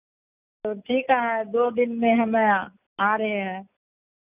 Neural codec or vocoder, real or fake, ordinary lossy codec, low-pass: none; real; none; 3.6 kHz